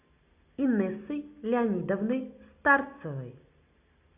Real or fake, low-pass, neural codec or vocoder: real; 3.6 kHz; none